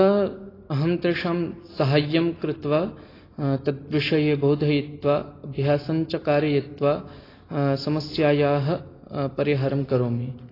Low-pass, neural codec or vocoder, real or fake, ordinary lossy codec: 5.4 kHz; none; real; AAC, 24 kbps